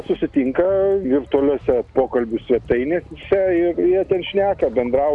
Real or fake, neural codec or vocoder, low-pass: real; none; 10.8 kHz